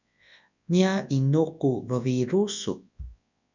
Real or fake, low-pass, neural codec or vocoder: fake; 7.2 kHz; codec, 24 kHz, 0.9 kbps, WavTokenizer, large speech release